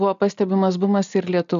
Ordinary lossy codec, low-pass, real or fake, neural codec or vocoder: AAC, 96 kbps; 7.2 kHz; real; none